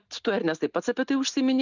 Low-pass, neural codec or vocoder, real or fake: 7.2 kHz; none; real